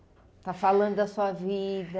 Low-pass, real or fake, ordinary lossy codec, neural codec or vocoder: none; real; none; none